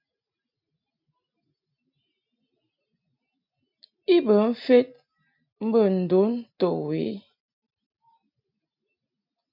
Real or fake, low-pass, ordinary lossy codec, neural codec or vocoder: real; 5.4 kHz; AAC, 48 kbps; none